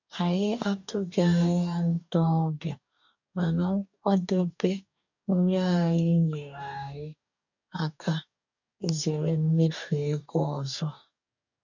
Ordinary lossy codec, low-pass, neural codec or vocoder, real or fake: none; 7.2 kHz; codec, 44.1 kHz, 2.6 kbps, DAC; fake